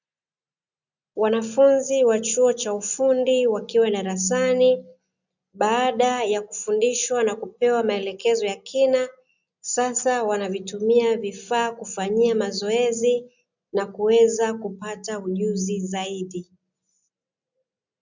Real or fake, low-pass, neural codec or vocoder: real; 7.2 kHz; none